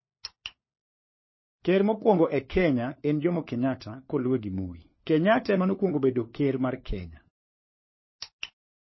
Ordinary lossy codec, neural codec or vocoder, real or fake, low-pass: MP3, 24 kbps; codec, 16 kHz, 4 kbps, FunCodec, trained on LibriTTS, 50 frames a second; fake; 7.2 kHz